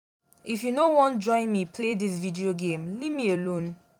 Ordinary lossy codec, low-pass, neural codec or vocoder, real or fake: none; 19.8 kHz; vocoder, 44.1 kHz, 128 mel bands every 256 samples, BigVGAN v2; fake